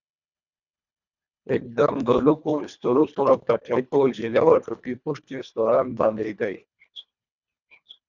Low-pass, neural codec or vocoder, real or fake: 7.2 kHz; codec, 24 kHz, 1.5 kbps, HILCodec; fake